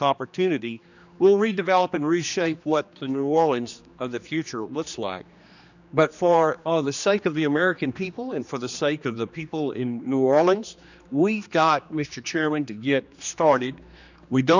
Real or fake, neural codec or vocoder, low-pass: fake; codec, 16 kHz, 2 kbps, X-Codec, HuBERT features, trained on general audio; 7.2 kHz